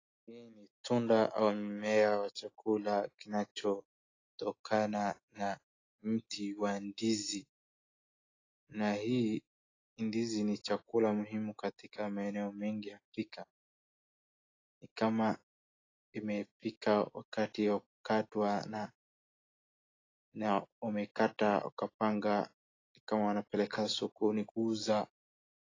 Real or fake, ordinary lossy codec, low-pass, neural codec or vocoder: real; AAC, 32 kbps; 7.2 kHz; none